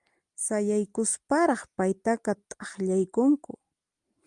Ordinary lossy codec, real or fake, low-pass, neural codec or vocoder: Opus, 32 kbps; real; 10.8 kHz; none